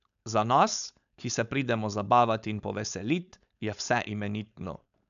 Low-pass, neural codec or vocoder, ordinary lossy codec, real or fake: 7.2 kHz; codec, 16 kHz, 4.8 kbps, FACodec; none; fake